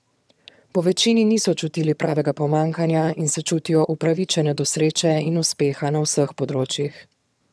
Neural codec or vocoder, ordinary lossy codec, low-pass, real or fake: vocoder, 22.05 kHz, 80 mel bands, HiFi-GAN; none; none; fake